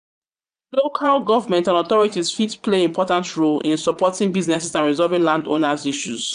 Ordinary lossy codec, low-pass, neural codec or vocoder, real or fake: none; 9.9 kHz; vocoder, 22.05 kHz, 80 mel bands, Vocos; fake